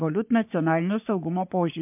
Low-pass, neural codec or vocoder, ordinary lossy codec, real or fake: 3.6 kHz; codec, 44.1 kHz, 3.4 kbps, Pupu-Codec; MP3, 32 kbps; fake